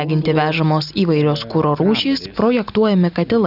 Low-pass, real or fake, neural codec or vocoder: 5.4 kHz; real; none